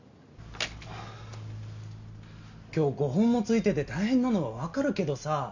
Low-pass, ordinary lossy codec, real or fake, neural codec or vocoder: 7.2 kHz; none; real; none